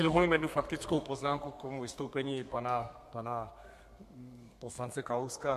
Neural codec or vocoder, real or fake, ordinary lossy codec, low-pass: codec, 32 kHz, 1.9 kbps, SNAC; fake; MP3, 64 kbps; 14.4 kHz